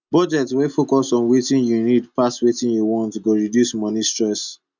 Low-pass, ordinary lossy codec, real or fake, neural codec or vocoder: 7.2 kHz; none; real; none